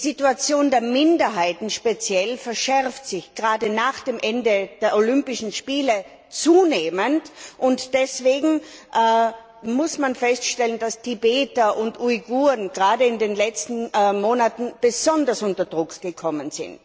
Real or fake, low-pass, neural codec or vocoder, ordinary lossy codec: real; none; none; none